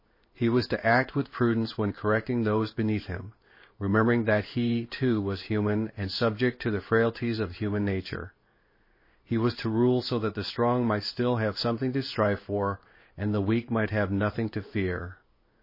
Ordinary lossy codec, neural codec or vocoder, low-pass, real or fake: MP3, 24 kbps; codec, 16 kHz in and 24 kHz out, 1 kbps, XY-Tokenizer; 5.4 kHz; fake